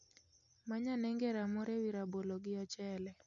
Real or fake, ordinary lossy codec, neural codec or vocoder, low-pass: real; none; none; 7.2 kHz